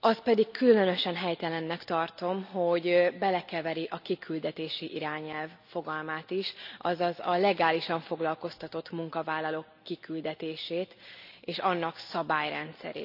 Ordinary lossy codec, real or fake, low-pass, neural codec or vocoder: none; real; 5.4 kHz; none